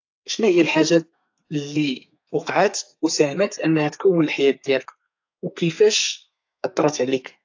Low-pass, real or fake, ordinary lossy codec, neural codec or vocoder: 7.2 kHz; fake; AAC, 48 kbps; codec, 32 kHz, 1.9 kbps, SNAC